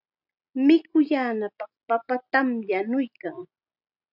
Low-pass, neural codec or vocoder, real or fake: 5.4 kHz; none; real